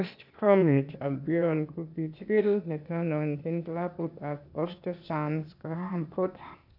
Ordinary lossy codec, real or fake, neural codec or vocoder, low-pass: none; fake; codec, 16 kHz, 0.8 kbps, ZipCodec; 5.4 kHz